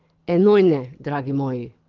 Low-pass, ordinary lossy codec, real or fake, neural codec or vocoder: 7.2 kHz; Opus, 32 kbps; fake; codec, 24 kHz, 6 kbps, HILCodec